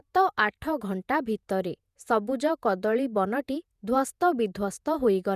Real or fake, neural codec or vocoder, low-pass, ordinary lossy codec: fake; vocoder, 48 kHz, 128 mel bands, Vocos; 14.4 kHz; none